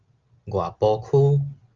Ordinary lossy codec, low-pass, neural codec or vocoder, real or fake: Opus, 24 kbps; 7.2 kHz; none; real